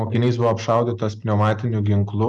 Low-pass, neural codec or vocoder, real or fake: 10.8 kHz; none; real